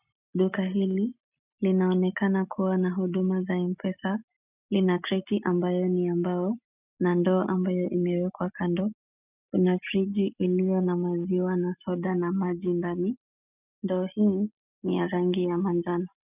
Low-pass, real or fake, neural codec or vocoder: 3.6 kHz; real; none